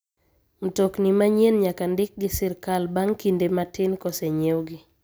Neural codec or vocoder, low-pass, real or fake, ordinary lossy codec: none; none; real; none